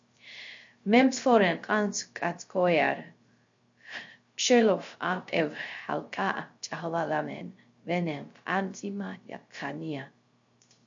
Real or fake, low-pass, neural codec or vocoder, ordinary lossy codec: fake; 7.2 kHz; codec, 16 kHz, 0.3 kbps, FocalCodec; MP3, 48 kbps